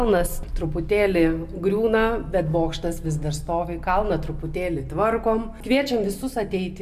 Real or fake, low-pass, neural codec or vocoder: real; 14.4 kHz; none